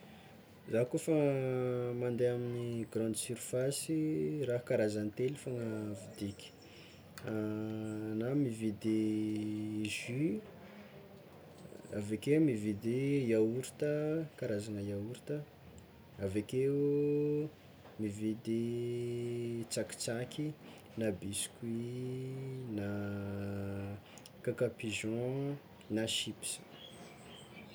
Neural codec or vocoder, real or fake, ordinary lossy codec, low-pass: none; real; none; none